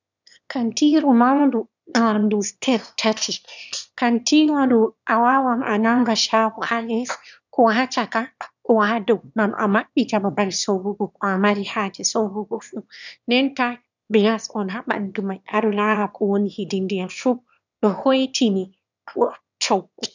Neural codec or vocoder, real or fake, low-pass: autoencoder, 22.05 kHz, a latent of 192 numbers a frame, VITS, trained on one speaker; fake; 7.2 kHz